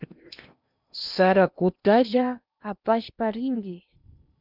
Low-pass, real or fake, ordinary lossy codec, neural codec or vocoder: 5.4 kHz; fake; Opus, 64 kbps; codec, 16 kHz in and 24 kHz out, 0.8 kbps, FocalCodec, streaming, 65536 codes